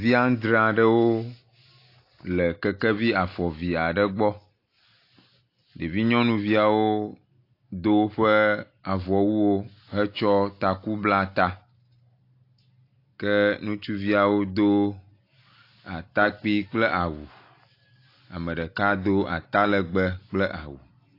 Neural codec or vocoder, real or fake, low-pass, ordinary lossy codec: none; real; 5.4 kHz; AAC, 32 kbps